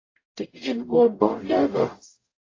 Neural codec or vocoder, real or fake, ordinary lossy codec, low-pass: codec, 44.1 kHz, 0.9 kbps, DAC; fake; AAC, 32 kbps; 7.2 kHz